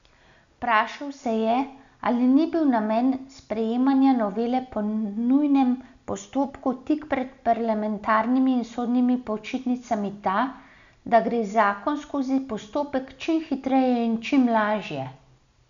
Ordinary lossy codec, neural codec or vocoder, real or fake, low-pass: none; none; real; 7.2 kHz